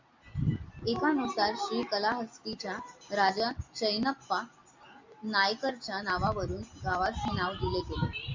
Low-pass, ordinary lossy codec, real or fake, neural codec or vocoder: 7.2 kHz; MP3, 64 kbps; real; none